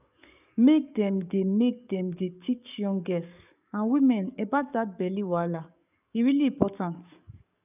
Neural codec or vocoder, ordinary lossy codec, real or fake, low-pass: codec, 44.1 kHz, 7.8 kbps, DAC; none; fake; 3.6 kHz